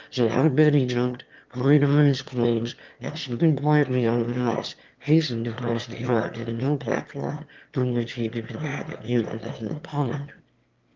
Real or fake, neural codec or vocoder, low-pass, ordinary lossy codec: fake; autoencoder, 22.05 kHz, a latent of 192 numbers a frame, VITS, trained on one speaker; 7.2 kHz; Opus, 32 kbps